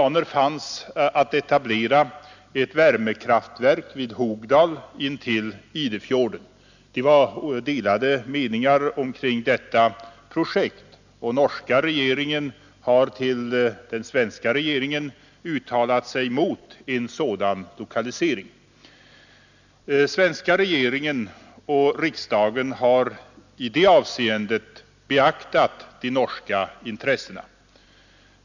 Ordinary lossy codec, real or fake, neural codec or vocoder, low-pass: none; real; none; 7.2 kHz